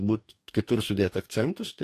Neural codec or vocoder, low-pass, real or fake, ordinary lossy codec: codec, 44.1 kHz, 2.6 kbps, DAC; 14.4 kHz; fake; AAC, 64 kbps